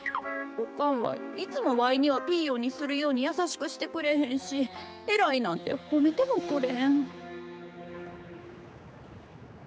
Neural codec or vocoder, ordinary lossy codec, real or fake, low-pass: codec, 16 kHz, 4 kbps, X-Codec, HuBERT features, trained on general audio; none; fake; none